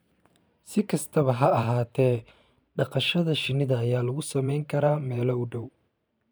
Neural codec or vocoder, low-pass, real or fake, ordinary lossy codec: vocoder, 44.1 kHz, 128 mel bands every 512 samples, BigVGAN v2; none; fake; none